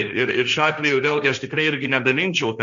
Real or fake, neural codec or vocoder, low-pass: fake; codec, 16 kHz, 1.1 kbps, Voila-Tokenizer; 7.2 kHz